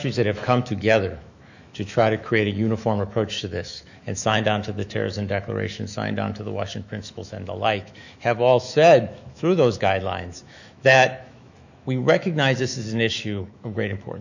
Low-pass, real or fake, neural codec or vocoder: 7.2 kHz; fake; autoencoder, 48 kHz, 128 numbers a frame, DAC-VAE, trained on Japanese speech